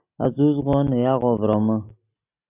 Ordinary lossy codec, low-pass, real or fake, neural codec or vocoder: AAC, 24 kbps; 3.6 kHz; real; none